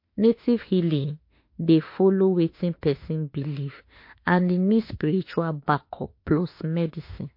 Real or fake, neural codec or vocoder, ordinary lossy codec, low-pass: fake; autoencoder, 48 kHz, 32 numbers a frame, DAC-VAE, trained on Japanese speech; MP3, 32 kbps; 5.4 kHz